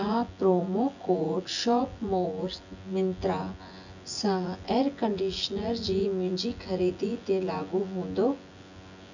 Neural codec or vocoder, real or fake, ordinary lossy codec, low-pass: vocoder, 24 kHz, 100 mel bands, Vocos; fake; none; 7.2 kHz